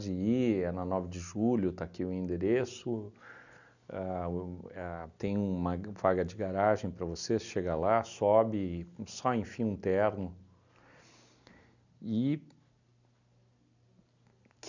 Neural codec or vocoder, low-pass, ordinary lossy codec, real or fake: none; 7.2 kHz; none; real